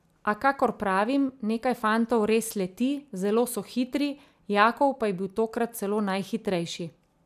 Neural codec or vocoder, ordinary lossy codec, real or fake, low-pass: none; none; real; 14.4 kHz